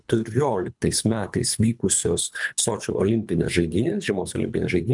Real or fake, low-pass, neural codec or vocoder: fake; 10.8 kHz; codec, 24 kHz, 3 kbps, HILCodec